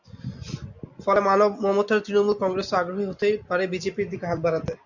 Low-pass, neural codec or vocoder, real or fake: 7.2 kHz; none; real